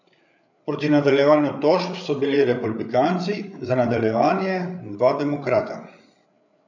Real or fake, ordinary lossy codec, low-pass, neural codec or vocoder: fake; none; 7.2 kHz; codec, 16 kHz, 8 kbps, FreqCodec, larger model